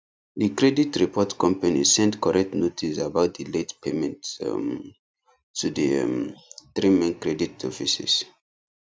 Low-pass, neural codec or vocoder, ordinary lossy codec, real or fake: none; none; none; real